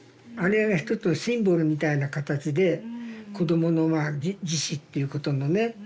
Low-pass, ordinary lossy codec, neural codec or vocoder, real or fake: none; none; none; real